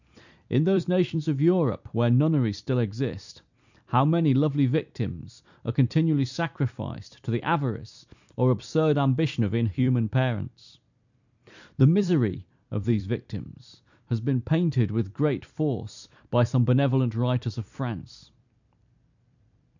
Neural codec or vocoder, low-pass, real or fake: vocoder, 44.1 kHz, 128 mel bands every 256 samples, BigVGAN v2; 7.2 kHz; fake